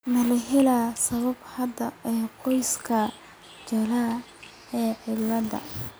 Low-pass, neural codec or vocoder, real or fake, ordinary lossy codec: none; none; real; none